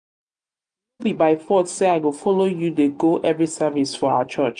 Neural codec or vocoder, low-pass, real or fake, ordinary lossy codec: none; 9.9 kHz; real; none